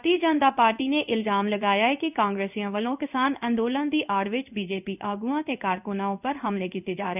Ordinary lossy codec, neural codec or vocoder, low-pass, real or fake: none; codec, 16 kHz in and 24 kHz out, 1 kbps, XY-Tokenizer; 3.6 kHz; fake